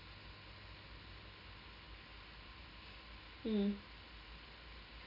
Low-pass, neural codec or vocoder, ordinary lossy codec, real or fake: 5.4 kHz; none; none; real